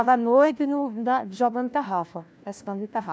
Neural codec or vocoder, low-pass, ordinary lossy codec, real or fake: codec, 16 kHz, 1 kbps, FunCodec, trained on LibriTTS, 50 frames a second; none; none; fake